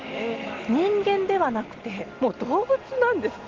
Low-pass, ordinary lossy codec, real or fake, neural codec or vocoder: 7.2 kHz; Opus, 16 kbps; real; none